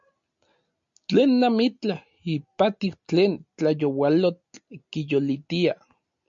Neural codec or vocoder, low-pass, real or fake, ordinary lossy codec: none; 7.2 kHz; real; MP3, 48 kbps